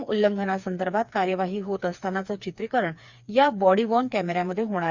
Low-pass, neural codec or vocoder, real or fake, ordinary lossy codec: 7.2 kHz; codec, 16 kHz, 4 kbps, FreqCodec, smaller model; fake; Opus, 64 kbps